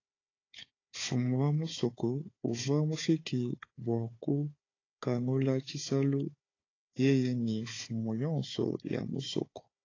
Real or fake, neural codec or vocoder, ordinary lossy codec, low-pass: fake; codec, 16 kHz, 4 kbps, FunCodec, trained on Chinese and English, 50 frames a second; AAC, 32 kbps; 7.2 kHz